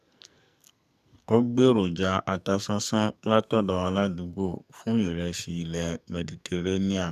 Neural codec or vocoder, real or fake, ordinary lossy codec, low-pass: codec, 44.1 kHz, 2.6 kbps, SNAC; fake; none; 14.4 kHz